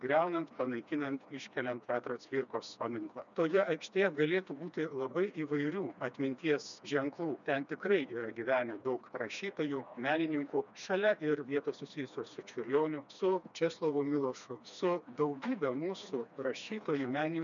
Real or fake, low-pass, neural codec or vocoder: fake; 7.2 kHz; codec, 16 kHz, 2 kbps, FreqCodec, smaller model